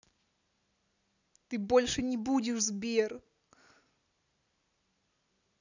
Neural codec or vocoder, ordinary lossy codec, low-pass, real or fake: none; none; 7.2 kHz; real